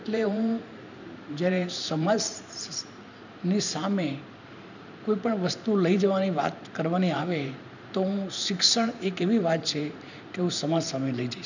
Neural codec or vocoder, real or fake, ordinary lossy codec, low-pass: vocoder, 44.1 kHz, 128 mel bands every 512 samples, BigVGAN v2; fake; none; 7.2 kHz